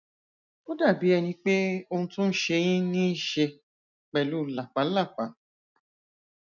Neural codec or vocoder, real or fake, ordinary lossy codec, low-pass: none; real; none; 7.2 kHz